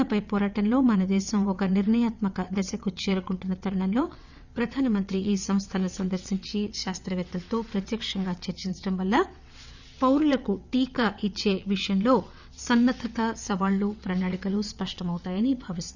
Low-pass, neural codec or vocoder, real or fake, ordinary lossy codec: 7.2 kHz; vocoder, 22.05 kHz, 80 mel bands, WaveNeXt; fake; none